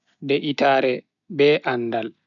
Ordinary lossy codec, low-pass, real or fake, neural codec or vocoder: none; 7.2 kHz; real; none